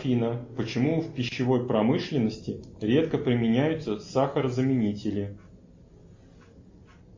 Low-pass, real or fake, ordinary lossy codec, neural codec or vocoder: 7.2 kHz; real; MP3, 32 kbps; none